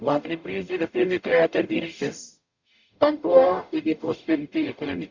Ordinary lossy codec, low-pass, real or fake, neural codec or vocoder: Opus, 64 kbps; 7.2 kHz; fake; codec, 44.1 kHz, 0.9 kbps, DAC